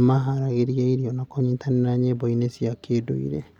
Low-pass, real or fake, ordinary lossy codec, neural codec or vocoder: 19.8 kHz; real; none; none